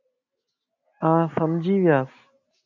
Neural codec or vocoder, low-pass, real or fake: none; 7.2 kHz; real